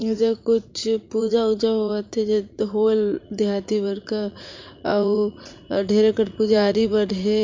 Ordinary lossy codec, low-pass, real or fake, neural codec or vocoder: MP3, 64 kbps; 7.2 kHz; fake; vocoder, 44.1 kHz, 80 mel bands, Vocos